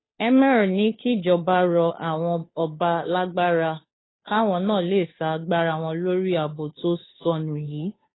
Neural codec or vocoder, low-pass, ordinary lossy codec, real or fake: codec, 16 kHz, 2 kbps, FunCodec, trained on Chinese and English, 25 frames a second; 7.2 kHz; AAC, 16 kbps; fake